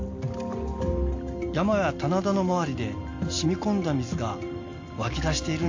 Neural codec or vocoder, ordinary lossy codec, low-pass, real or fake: none; AAC, 48 kbps; 7.2 kHz; real